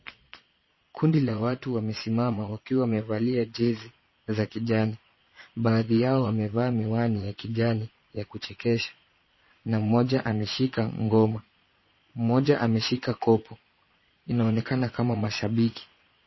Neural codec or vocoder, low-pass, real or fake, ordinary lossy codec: vocoder, 22.05 kHz, 80 mel bands, Vocos; 7.2 kHz; fake; MP3, 24 kbps